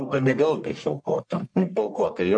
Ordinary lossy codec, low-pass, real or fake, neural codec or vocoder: MP3, 64 kbps; 9.9 kHz; fake; codec, 44.1 kHz, 1.7 kbps, Pupu-Codec